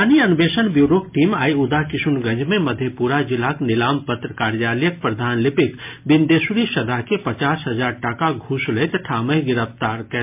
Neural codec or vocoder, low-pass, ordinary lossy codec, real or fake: none; 3.6 kHz; MP3, 32 kbps; real